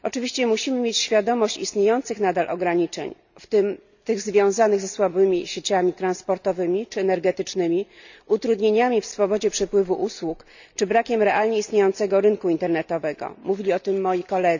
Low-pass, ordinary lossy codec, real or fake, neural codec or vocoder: 7.2 kHz; none; real; none